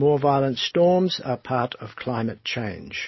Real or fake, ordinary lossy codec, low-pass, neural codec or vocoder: real; MP3, 24 kbps; 7.2 kHz; none